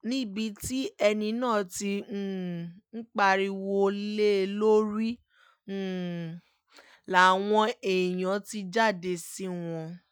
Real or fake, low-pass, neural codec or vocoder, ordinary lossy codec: real; none; none; none